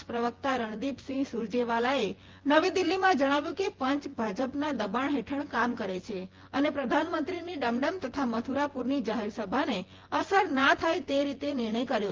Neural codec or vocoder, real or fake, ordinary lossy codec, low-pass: vocoder, 24 kHz, 100 mel bands, Vocos; fake; Opus, 16 kbps; 7.2 kHz